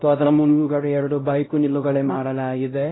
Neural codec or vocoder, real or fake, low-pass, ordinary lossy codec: codec, 16 kHz, 0.5 kbps, X-Codec, WavLM features, trained on Multilingual LibriSpeech; fake; 7.2 kHz; AAC, 16 kbps